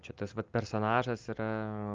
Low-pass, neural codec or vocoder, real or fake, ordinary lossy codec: 7.2 kHz; none; real; Opus, 32 kbps